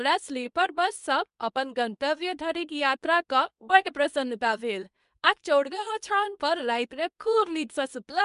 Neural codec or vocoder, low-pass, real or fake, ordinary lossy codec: codec, 24 kHz, 0.9 kbps, WavTokenizer, medium speech release version 2; 10.8 kHz; fake; none